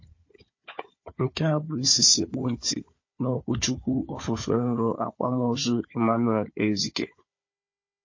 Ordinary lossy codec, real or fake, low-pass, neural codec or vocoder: MP3, 32 kbps; fake; 7.2 kHz; codec, 16 kHz, 4 kbps, FunCodec, trained on Chinese and English, 50 frames a second